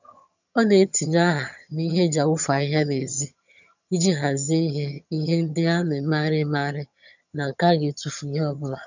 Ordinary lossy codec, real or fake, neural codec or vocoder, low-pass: none; fake; vocoder, 22.05 kHz, 80 mel bands, HiFi-GAN; 7.2 kHz